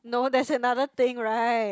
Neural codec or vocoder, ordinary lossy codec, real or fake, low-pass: none; none; real; none